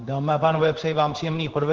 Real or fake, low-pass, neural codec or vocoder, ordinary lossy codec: fake; 7.2 kHz; codec, 16 kHz in and 24 kHz out, 1 kbps, XY-Tokenizer; Opus, 16 kbps